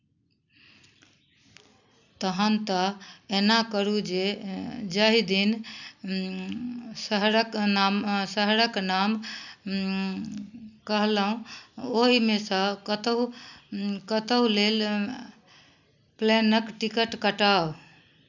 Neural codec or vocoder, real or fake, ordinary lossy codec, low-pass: none; real; none; 7.2 kHz